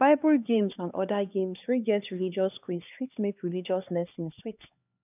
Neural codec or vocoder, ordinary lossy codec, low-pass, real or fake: codec, 16 kHz, 2 kbps, X-Codec, HuBERT features, trained on LibriSpeech; none; 3.6 kHz; fake